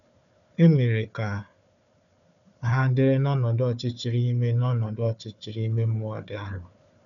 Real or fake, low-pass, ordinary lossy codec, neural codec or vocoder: fake; 7.2 kHz; none; codec, 16 kHz, 4 kbps, FunCodec, trained on Chinese and English, 50 frames a second